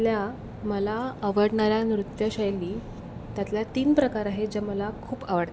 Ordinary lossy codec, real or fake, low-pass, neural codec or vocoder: none; real; none; none